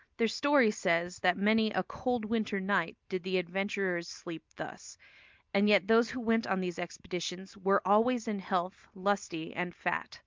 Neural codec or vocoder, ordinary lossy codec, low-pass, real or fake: none; Opus, 24 kbps; 7.2 kHz; real